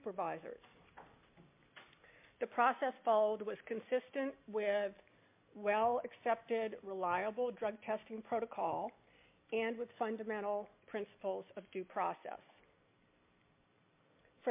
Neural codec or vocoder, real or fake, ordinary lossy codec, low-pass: none; real; MP3, 32 kbps; 3.6 kHz